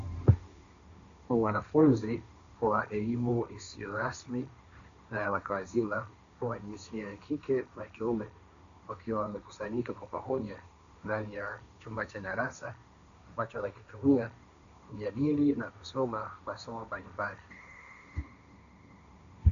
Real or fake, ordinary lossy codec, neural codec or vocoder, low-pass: fake; AAC, 96 kbps; codec, 16 kHz, 1.1 kbps, Voila-Tokenizer; 7.2 kHz